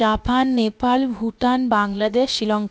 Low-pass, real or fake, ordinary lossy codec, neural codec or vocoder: none; fake; none; codec, 16 kHz, about 1 kbps, DyCAST, with the encoder's durations